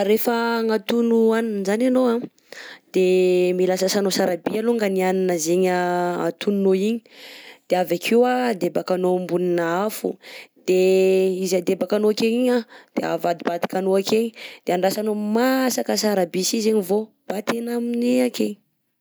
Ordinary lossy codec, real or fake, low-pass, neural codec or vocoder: none; real; none; none